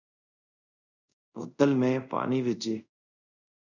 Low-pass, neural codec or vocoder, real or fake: 7.2 kHz; codec, 24 kHz, 0.5 kbps, DualCodec; fake